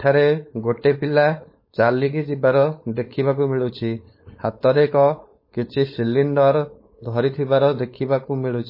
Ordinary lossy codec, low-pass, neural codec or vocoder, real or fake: MP3, 24 kbps; 5.4 kHz; codec, 16 kHz, 4.8 kbps, FACodec; fake